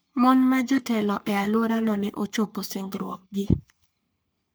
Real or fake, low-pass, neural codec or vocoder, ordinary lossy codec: fake; none; codec, 44.1 kHz, 3.4 kbps, Pupu-Codec; none